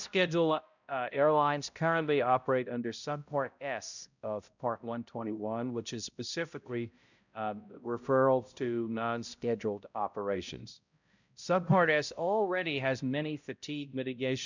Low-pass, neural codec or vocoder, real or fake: 7.2 kHz; codec, 16 kHz, 0.5 kbps, X-Codec, HuBERT features, trained on balanced general audio; fake